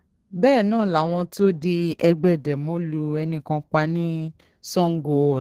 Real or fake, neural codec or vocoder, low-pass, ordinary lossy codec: fake; codec, 32 kHz, 1.9 kbps, SNAC; 14.4 kHz; Opus, 16 kbps